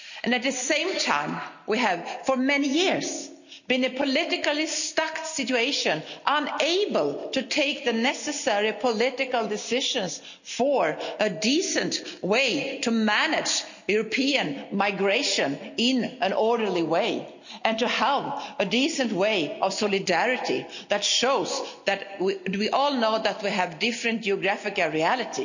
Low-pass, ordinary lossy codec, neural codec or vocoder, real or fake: 7.2 kHz; none; none; real